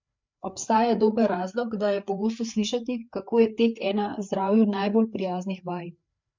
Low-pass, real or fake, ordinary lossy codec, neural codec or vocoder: 7.2 kHz; fake; MP3, 64 kbps; codec, 16 kHz, 4 kbps, FreqCodec, larger model